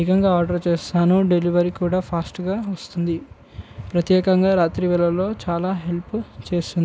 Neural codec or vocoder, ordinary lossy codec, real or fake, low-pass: none; none; real; none